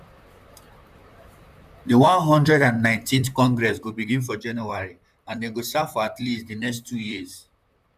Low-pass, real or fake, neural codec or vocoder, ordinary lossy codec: 14.4 kHz; fake; vocoder, 44.1 kHz, 128 mel bands, Pupu-Vocoder; none